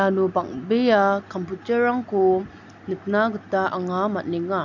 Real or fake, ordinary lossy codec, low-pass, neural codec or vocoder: real; none; 7.2 kHz; none